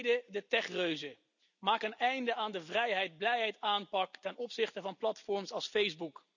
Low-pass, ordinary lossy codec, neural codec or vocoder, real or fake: 7.2 kHz; none; none; real